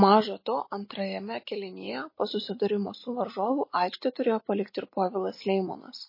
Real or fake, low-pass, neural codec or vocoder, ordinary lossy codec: real; 5.4 kHz; none; MP3, 24 kbps